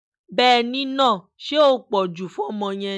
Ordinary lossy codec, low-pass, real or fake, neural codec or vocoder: none; none; real; none